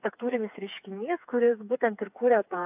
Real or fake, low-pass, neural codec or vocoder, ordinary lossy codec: fake; 3.6 kHz; codec, 16 kHz, 4 kbps, FreqCodec, smaller model; AAC, 32 kbps